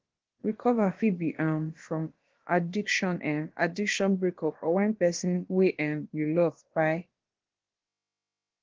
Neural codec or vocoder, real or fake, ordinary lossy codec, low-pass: codec, 16 kHz, about 1 kbps, DyCAST, with the encoder's durations; fake; Opus, 16 kbps; 7.2 kHz